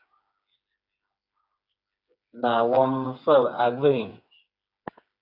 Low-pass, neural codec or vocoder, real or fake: 5.4 kHz; codec, 16 kHz, 4 kbps, FreqCodec, smaller model; fake